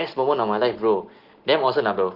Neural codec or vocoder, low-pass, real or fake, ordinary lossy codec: none; 5.4 kHz; real; Opus, 16 kbps